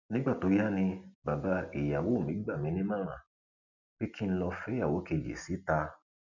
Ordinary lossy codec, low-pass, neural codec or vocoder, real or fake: none; 7.2 kHz; none; real